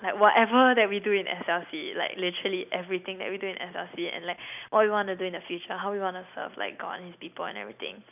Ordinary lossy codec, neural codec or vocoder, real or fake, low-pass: none; none; real; 3.6 kHz